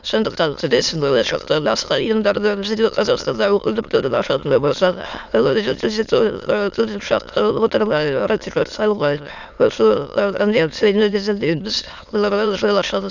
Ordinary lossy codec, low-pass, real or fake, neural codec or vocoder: none; 7.2 kHz; fake; autoencoder, 22.05 kHz, a latent of 192 numbers a frame, VITS, trained on many speakers